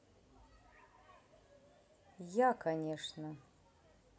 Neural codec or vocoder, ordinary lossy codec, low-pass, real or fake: none; none; none; real